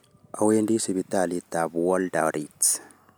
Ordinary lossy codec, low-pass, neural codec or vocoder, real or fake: none; none; none; real